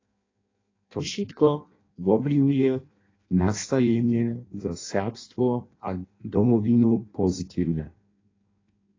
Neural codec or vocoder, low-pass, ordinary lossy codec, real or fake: codec, 16 kHz in and 24 kHz out, 0.6 kbps, FireRedTTS-2 codec; 7.2 kHz; AAC, 32 kbps; fake